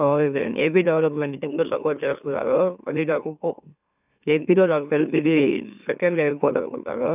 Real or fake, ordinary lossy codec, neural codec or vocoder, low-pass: fake; none; autoencoder, 44.1 kHz, a latent of 192 numbers a frame, MeloTTS; 3.6 kHz